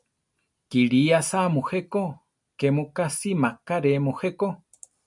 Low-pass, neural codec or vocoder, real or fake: 10.8 kHz; none; real